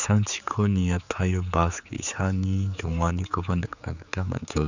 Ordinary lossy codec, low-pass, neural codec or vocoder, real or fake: none; 7.2 kHz; codec, 16 kHz, 4 kbps, X-Codec, HuBERT features, trained on general audio; fake